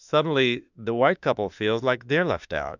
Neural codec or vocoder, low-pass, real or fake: autoencoder, 48 kHz, 32 numbers a frame, DAC-VAE, trained on Japanese speech; 7.2 kHz; fake